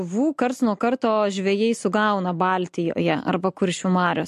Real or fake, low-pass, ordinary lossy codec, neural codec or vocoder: real; 14.4 kHz; MP3, 64 kbps; none